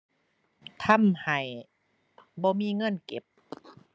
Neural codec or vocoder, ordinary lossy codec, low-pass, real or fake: none; none; none; real